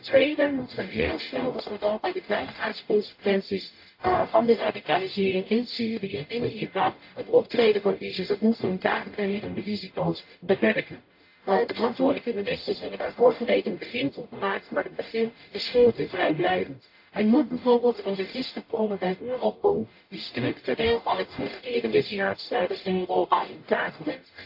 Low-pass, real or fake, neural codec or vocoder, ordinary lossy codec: 5.4 kHz; fake; codec, 44.1 kHz, 0.9 kbps, DAC; AAC, 32 kbps